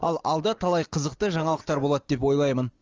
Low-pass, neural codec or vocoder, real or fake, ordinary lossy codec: 7.2 kHz; none; real; Opus, 32 kbps